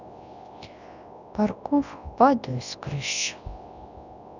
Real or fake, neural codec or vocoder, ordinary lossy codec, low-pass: fake; codec, 24 kHz, 0.9 kbps, WavTokenizer, large speech release; none; 7.2 kHz